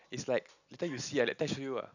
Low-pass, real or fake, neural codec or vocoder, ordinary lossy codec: 7.2 kHz; real; none; none